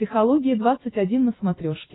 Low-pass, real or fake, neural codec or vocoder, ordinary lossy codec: 7.2 kHz; real; none; AAC, 16 kbps